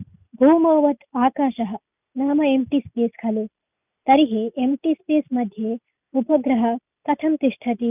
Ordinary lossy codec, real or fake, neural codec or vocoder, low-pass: none; real; none; 3.6 kHz